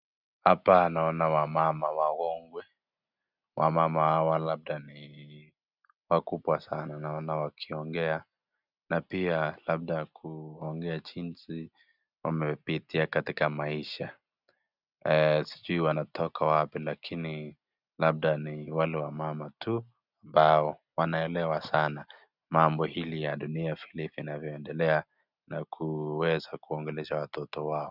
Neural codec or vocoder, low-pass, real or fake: none; 5.4 kHz; real